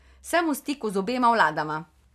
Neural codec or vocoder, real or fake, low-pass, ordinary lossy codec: none; real; 14.4 kHz; none